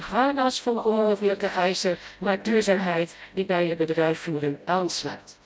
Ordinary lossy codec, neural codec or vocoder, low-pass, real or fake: none; codec, 16 kHz, 0.5 kbps, FreqCodec, smaller model; none; fake